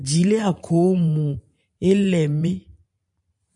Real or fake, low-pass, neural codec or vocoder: fake; 10.8 kHz; vocoder, 44.1 kHz, 128 mel bands every 512 samples, BigVGAN v2